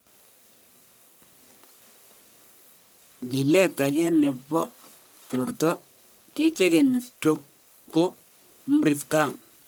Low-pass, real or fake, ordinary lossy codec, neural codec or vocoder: none; fake; none; codec, 44.1 kHz, 1.7 kbps, Pupu-Codec